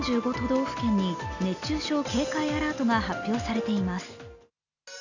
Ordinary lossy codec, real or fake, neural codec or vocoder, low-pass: none; real; none; 7.2 kHz